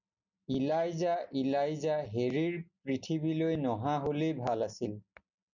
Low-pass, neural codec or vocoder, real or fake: 7.2 kHz; none; real